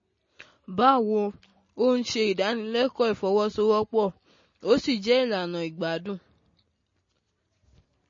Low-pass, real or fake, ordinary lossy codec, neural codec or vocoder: 7.2 kHz; real; MP3, 32 kbps; none